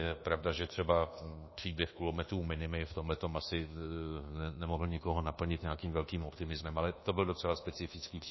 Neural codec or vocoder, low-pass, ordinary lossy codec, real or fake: codec, 24 kHz, 1.2 kbps, DualCodec; 7.2 kHz; MP3, 24 kbps; fake